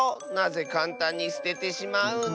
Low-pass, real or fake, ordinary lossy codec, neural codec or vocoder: none; real; none; none